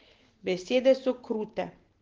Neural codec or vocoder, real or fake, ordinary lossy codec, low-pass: none; real; Opus, 16 kbps; 7.2 kHz